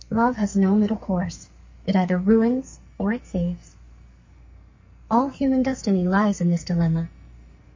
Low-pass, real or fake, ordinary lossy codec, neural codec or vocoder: 7.2 kHz; fake; MP3, 32 kbps; codec, 44.1 kHz, 2.6 kbps, SNAC